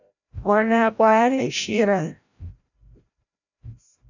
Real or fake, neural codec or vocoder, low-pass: fake; codec, 16 kHz, 0.5 kbps, FreqCodec, larger model; 7.2 kHz